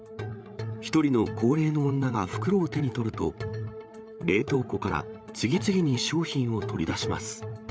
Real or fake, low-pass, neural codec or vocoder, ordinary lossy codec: fake; none; codec, 16 kHz, 8 kbps, FreqCodec, larger model; none